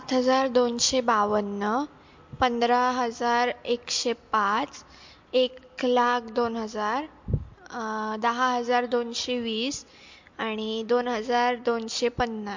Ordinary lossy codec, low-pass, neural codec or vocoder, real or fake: MP3, 48 kbps; 7.2 kHz; none; real